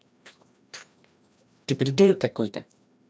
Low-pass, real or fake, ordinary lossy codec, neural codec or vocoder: none; fake; none; codec, 16 kHz, 1 kbps, FreqCodec, larger model